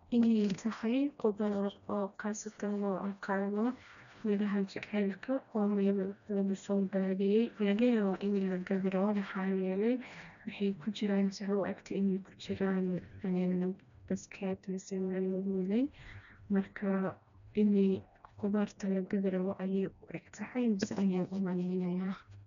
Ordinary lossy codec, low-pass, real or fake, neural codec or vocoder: none; 7.2 kHz; fake; codec, 16 kHz, 1 kbps, FreqCodec, smaller model